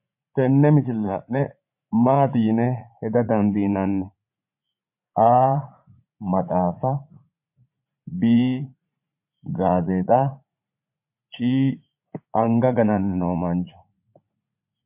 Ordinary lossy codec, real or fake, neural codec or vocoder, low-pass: MP3, 32 kbps; fake; vocoder, 44.1 kHz, 80 mel bands, Vocos; 3.6 kHz